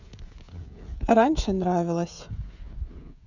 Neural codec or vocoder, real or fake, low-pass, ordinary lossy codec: codec, 16 kHz, 16 kbps, FreqCodec, smaller model; fake; 7.2 kHz; none